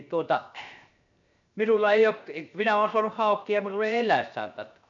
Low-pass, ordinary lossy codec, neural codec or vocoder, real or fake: 7.2 kHz; none; codec, 16 kHz, 0.7 kbps, FocalCodec; fake